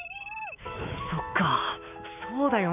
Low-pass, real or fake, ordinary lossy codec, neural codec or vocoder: 3.6 kHz; fake; none; autoencoder, 48 kHz, 128 numbers a frame, DAC-VAE, trained on Japanese speech